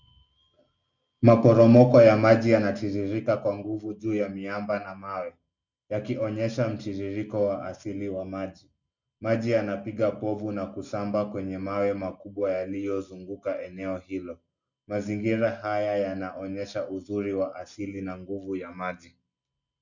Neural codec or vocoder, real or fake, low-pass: none; real; 7.2 kHz